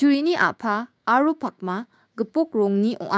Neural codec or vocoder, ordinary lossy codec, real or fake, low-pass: codec, 16 kHz, 6 kbps, DAC; none; fake; none